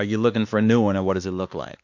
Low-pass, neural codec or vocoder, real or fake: 7.2 kHz; codec, 16 kHz, 2 kbps, X-Codec, WavLM features, trained on Multilingual LibriSpeech; fake